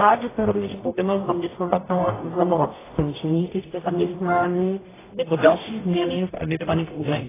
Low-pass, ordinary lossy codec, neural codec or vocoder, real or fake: 3.6 kHz; AAC, 16 kbps; codec, 44.1 kHz, 0.9 kbps, DAC; fake